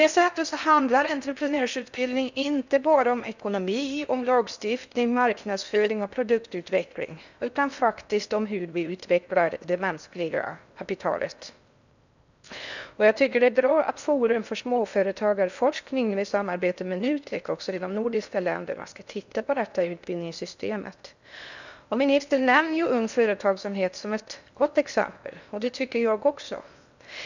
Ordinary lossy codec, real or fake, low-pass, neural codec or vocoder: none; fake; 7.2 kHz; codec, 16 kHz in and 24 kHz out, 0.6 kbps, FocalCodec, streaming, 4096 codes